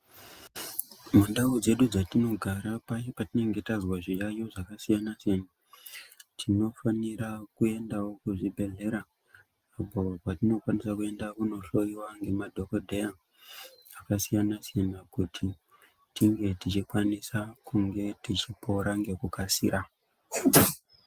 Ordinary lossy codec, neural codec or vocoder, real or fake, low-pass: Opus, 32 kbps; none; real; 14.4 kHz